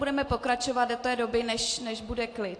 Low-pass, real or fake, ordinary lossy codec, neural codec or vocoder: 9.9 kHz; real; AAC, 48 kbps; none